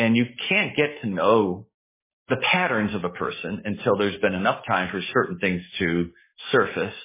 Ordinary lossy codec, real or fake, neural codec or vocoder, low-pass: MP3, 16 kbps; fake; codec, 44.1 kHz, 7.8 kbps, DAC; 3.6 kHz